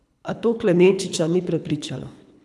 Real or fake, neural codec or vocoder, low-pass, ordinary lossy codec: fake; codec, 24 kHz, 3 kbps, HILCodec; none; none